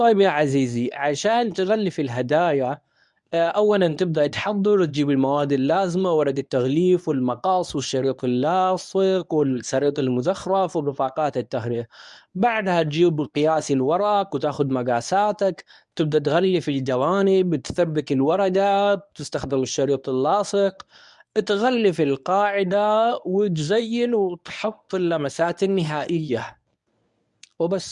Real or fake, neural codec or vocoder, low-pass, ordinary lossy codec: fake; codec, 24 kHz, 0.9 kbps, WavTokenizer, medium speech release version 1; 10.8 kHz; none